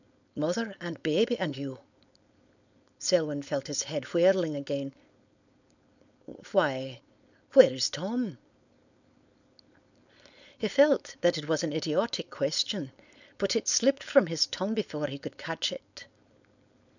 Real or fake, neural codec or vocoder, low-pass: fake; codec, 16 kHz, 4.8 kbps, FACodec; 7.2 kHz